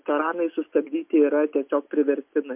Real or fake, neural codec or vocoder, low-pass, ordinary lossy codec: real; none; 3.6 kHz; MP3, 32 kbps